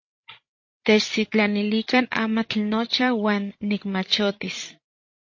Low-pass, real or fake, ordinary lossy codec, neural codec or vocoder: 7.2 kHz; fake; MP3, 32 kbps; codec, 16 kHz, 16 kbps, FreqCodec, larger model